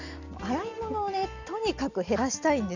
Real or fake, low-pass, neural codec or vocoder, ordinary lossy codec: real; 7.2 kHz; none; none